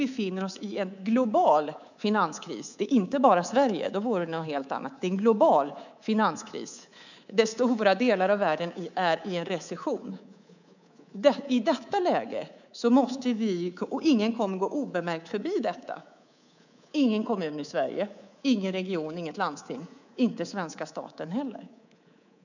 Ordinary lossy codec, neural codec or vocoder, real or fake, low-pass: none; codec, 24 kHz, 3.1 kbps, DualCodec; fake; 7.2 kHz